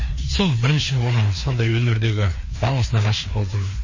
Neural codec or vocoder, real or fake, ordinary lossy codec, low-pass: codec, 16 kHz, 2 kbps, FreqCodec, larger model; fake; MP3, 32 kbps; 7.2 kHz